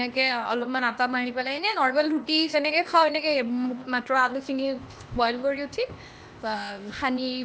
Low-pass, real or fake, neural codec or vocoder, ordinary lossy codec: none; fake; codec, 16 kHz, 0.8 kbps, ZipCodec; none